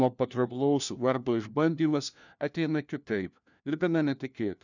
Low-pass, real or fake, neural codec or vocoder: 7.2 kHz; fake; codec, 16 kHz, 1 kbps, FunCodec, trained on LibriTTS, 50 frames a second